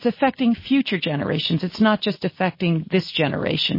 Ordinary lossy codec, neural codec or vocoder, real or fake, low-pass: MP3, 24 kbps; none; real; 5.4 kHz